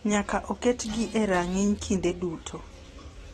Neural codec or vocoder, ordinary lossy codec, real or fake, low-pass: none; AAC, 32 kbps; real; 19.8 kHz